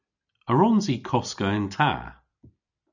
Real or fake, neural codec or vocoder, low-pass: real; none; 7.2 kHz